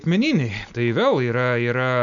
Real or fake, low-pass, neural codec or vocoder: real; 7.2 kHz; none